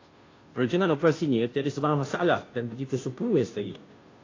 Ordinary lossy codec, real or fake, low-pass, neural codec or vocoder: AAC, 32 kbps; fake; 7.2 kHz; codec, 16 kHz, 0.5 kbps, FunCodec, trained on Chinese and English, 25 frames a second